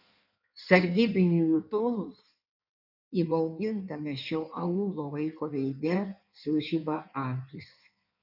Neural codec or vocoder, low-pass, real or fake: codec, 16 kHz in and 24 kHz out, 1.1 kbps, FireRedTTS-2 codec; 5.4 kHz; fake